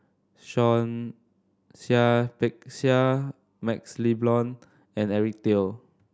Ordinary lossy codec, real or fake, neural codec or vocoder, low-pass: none; real; none; none